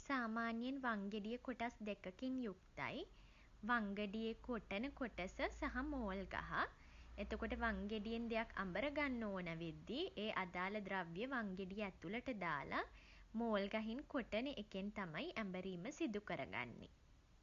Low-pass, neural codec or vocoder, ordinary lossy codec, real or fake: 7.2 kHz; none; Opus, 64 kbps; real